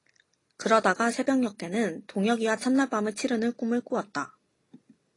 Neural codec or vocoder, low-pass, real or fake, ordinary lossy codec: none; 10.8 kHz; real; AAC, 32 kbps